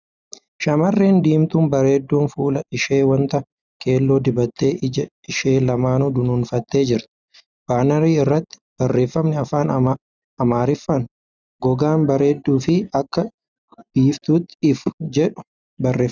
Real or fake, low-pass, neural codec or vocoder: real; 7.2 kHz; none